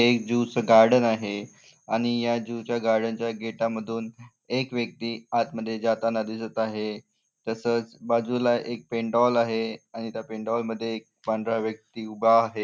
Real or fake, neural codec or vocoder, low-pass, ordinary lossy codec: real; none; none; none